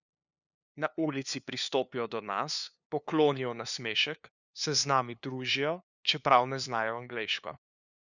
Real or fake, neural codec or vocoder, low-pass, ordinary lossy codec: fake; codec, 16 kHz, 2 kbps, FunCodec, trained on LibriTTS, 25 frames a second; 7.2 kHz; none